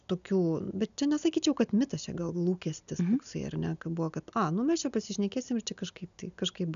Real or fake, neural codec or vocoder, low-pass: real; none; 7.2 kHz